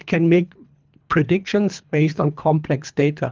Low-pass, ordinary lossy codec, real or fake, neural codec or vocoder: 7.2 kHz; Opus, 32 kbps; fake; codec, 24 kHz, 3 kbps, HILCodec